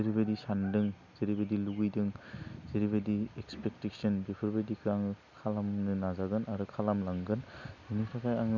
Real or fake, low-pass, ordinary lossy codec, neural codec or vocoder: real; 7.2 kHz; none; none